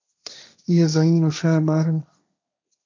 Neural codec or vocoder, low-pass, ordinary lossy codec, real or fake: codec, 16 kHz, 1.1 kbps, Voila-Tokenizer; 7.2 kHz; MP3, 64 kbps; fake